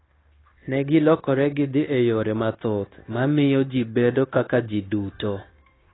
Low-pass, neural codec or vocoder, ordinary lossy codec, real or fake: 7.2 kHz; codec, 16 kHz, 0.9 kbps, LongCat-Audio-Codec; AAC, 16 kbps; fake